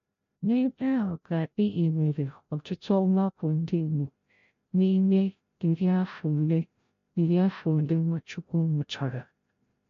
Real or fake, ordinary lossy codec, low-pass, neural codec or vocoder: fake; MP3, 48 kbps; 7.2 kHz; codec, 16 kHz, 0.5 kbps, FreqCodec, larger model